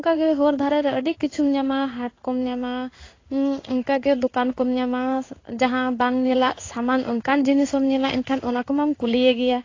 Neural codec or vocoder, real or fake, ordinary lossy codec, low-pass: codec, 16 kHz in and 24 kHz out, 1 kbps, XY-Tokenizer; fake; AAC, 32 kbps; 7.2 kHz